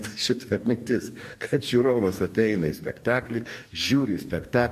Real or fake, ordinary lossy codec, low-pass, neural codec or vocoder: fake; MP3, 64 kbps; 14.4 kHz; codec, 44.1 kHz, 2.6 kbps, DAC